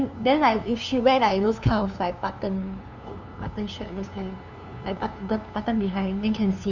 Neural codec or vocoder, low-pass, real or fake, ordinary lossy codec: codec, 16 kHz, 2 kbps, FunCodec, trained on LibriTTS, 25 frames a second; 7.2 kHz; fake; none